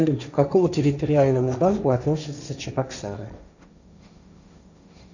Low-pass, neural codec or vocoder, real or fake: 7.2 kHz; codec, 16 kHz, 1.1 kbps, Voila-Tokenizer; fake